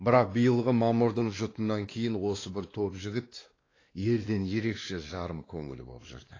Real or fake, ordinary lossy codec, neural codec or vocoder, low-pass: fake; AAC, 32 kbps; codec, 16 kHz, 2 kbps, X-Codec, WavLM features, trained on Multilingual LibriSpeech; 7.2 kHz